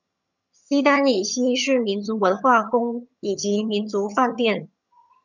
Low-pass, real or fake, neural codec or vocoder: 7.2 kHz; fake; vocoder, 22.05 kHz, 80 mel bands, HiFi-GAN